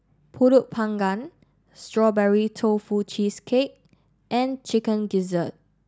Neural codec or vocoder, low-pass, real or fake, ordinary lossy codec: none; none; real; none